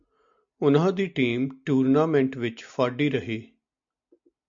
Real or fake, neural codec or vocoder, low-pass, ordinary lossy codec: real; none; 7.2 kHz; MP3, 64 kbps